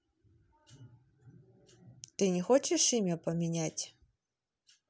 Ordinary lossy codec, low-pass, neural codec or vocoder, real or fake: none; none; none; real